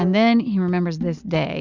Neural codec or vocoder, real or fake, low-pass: none; real; 7.2 kHz